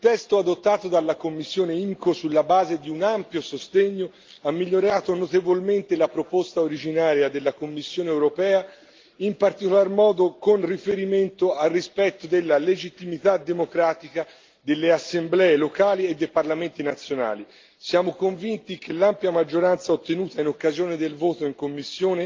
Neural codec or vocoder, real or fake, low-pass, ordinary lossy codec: none; real; 7.2 kHz; Opus, 32 kbps